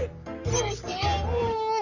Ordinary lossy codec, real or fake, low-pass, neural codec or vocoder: none; fake; 7.2 kHz; codec, 44.1 kHz, 3.4 kbps, Pupu-Codec